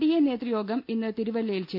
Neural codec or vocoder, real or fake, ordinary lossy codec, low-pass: none; real; none; 5.4 kHz